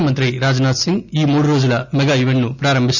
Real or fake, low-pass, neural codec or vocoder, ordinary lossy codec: real; 7.2 kHz; none; none